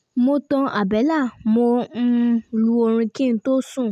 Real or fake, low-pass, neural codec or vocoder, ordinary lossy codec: real; 14.4 kHz; none; none